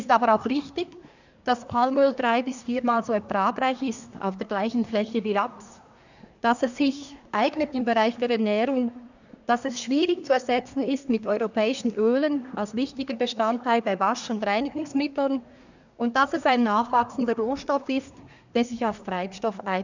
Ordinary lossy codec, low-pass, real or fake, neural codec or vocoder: none; 7.2 kHz; fake; codec, 24 kHz, 1 kbps, SNAC